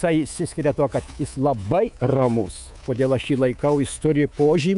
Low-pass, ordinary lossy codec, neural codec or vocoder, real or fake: 10.8 kHz; AAC, 96 kbps; codec, 24 kHz, 3.1 kbps, DualCodec; fake